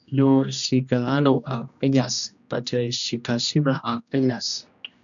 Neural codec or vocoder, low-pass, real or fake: codec, 16 kHz, 1 kbps, X-Codec, HuBERT features, trained on general audio; 7.2 kHz; fake